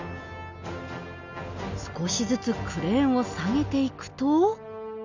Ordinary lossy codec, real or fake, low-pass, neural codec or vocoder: none; real; 7.2 kHz; none